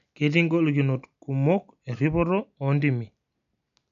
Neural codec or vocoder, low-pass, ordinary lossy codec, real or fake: none; 7.2 kHz; none; real